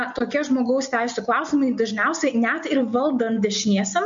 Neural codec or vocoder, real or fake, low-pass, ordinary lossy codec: none; real; 7.2 kHz; MP3, 48 kbps